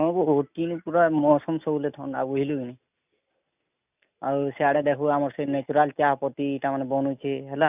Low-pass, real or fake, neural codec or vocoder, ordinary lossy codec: 3.6 kHz; real; none; none